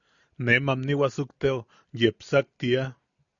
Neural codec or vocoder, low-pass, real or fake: none; 7.2 kHz; real